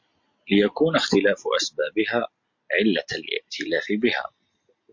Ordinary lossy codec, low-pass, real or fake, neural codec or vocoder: MP3, 48 kbps; 7.2 kHz; real; none